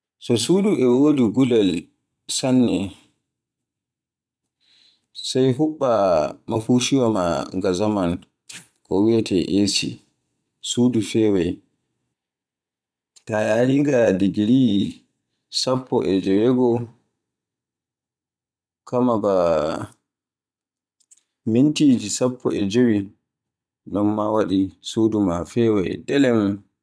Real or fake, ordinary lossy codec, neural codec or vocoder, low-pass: fake; none; vocoder, 22.05 kHz, 80 mel bands, Vocos; none